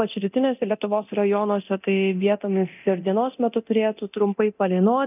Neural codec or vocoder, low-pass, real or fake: codec, 24 kHz, 0.9 kbps, DualCodec; 3.6 kHz; fake